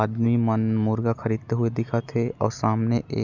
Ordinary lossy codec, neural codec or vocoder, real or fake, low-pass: none; none; real; 7.2 kHz